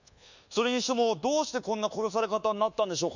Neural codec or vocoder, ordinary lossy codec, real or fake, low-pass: codec, 24 kHz, 1.2 kbps, DualCodec; none; fake; 7.2 kHz